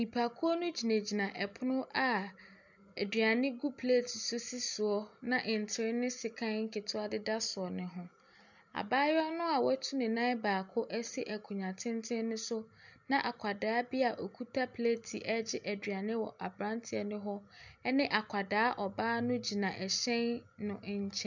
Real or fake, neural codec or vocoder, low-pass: real; none; 7.2 kHz